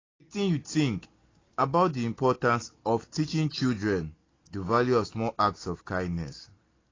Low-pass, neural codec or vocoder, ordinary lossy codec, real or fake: 7.2 kHz; none; AAC, 32 kbps; real